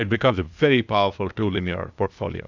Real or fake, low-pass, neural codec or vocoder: fake; 7.2 kHz; codec, 16 kHz, 0.8 kbps, ZipCodec